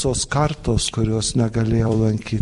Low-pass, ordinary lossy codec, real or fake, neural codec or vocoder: 14.4 kHz; MP3, 48 kbps; fake; vocoder, 44.1 kHz, 128 mel bands every 256 samples, BigVGAN v2